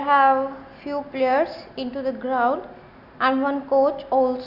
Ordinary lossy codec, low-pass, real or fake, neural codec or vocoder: none; 5.4 kHz; real; none